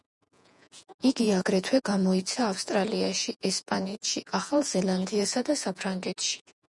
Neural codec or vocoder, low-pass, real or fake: vocoder, 48 kHz, 128 mel bands, Vocos; 10.8 kHz; fake